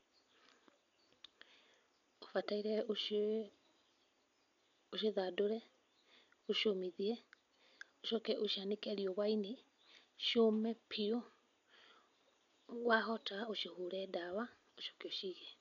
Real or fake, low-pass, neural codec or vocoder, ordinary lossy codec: real; 7.2 kHz; none; none